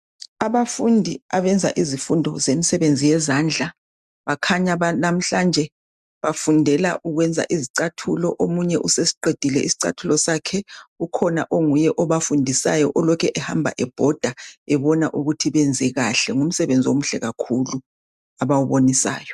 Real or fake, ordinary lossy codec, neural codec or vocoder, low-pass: real; MP3, 96 kbps; none; 14.4 kHz